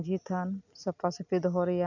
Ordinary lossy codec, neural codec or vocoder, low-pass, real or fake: none; none; 7.2 kHz; real